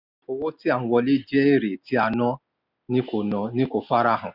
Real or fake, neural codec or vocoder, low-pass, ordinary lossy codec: real; none; 5.4 kHz; none